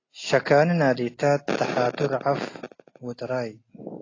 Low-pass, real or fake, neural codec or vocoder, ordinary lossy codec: 7.2 kHz; real; none; AAC, 32 kbps